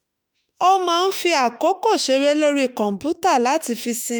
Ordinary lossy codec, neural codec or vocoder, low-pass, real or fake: none; autoencoder, 48 kHz, 32 numbers a frame, DAC-VAE, trained on Japanese speech; none; fake